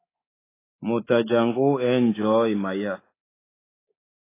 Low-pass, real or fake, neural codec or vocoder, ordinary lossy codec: 3.6 kHz; fake; codec, 24 kHz, 3.1 kbps, DualCodec; AAC, 16 kbps